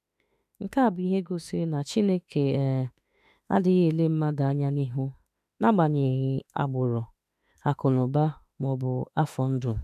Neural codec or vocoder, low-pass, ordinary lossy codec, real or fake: autoencoder, 48 kHz, 32 numbers a frame, DAC-VAE, trained on Japanese speech; 14.4 kHz; none; fake